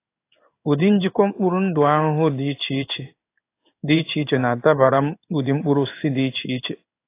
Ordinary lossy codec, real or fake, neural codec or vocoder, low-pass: AAC, 24 kbps; fake; codec, 16 kHz in and 24 kHz out, 1 kbps, XY-Tokenizer; 3.6 kHz